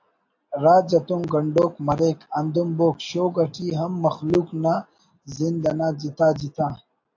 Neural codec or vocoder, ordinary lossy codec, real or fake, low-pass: none; AAC, 48 kbps; real; 7.2 kHz